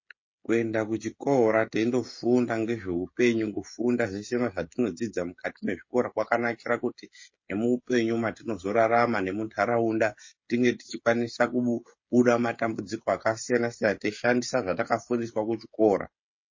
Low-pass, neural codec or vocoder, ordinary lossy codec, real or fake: 7.2 kHz; codec, 16 kHz, 16 kbps, FreqCodec, smaller model; MP3, 32 kbps; fake